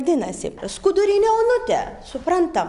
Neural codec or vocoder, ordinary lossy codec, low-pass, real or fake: none; Opus, 64 kbps; 10.8 kHz; real